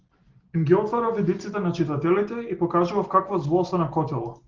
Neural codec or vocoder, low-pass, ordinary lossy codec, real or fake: none; 7.2 kHz; Opus, 16 kbps; real